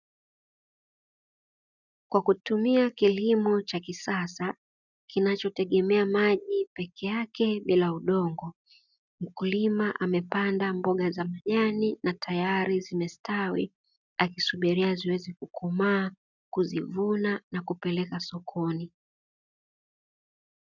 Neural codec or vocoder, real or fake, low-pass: none; real; 7.2 kHz